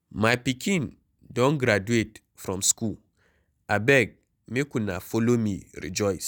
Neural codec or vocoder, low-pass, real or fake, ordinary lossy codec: none; none; real; none